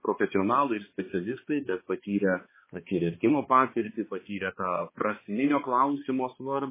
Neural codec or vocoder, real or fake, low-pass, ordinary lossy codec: codec, 16 kHz, 2 kbps, X-Codec, HuBERT features, trained on balanced general audio; fake; 3.6 kHz; MP3, 16 kbps